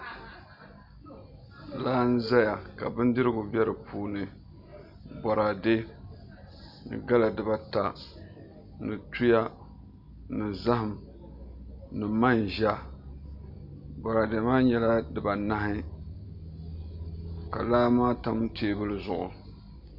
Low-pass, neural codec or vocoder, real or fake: 5.4 kHz; none; real